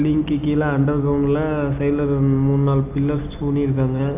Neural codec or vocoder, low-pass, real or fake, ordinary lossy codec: none; 3.6 kHz; real; none